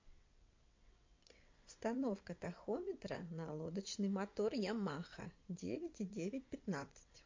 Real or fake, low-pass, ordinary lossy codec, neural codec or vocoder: fake; 7.2 kHz; MP3, 48 kbps; vocoder, 22.05 kHz, 80 mel bands, WaveNeXt